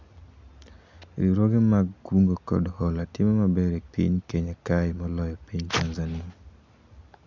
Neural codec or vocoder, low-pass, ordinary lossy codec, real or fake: none; 7.2 kHz; none; real